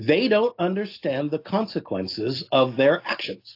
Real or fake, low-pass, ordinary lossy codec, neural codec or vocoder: real; 5.4 kHz; AAC, 32 kbps; none